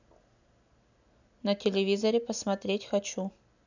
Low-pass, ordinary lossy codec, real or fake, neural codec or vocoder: 7.2 kHz; none; real; none